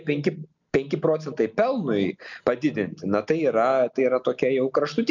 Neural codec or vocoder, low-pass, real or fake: none; 7.2 kHz; real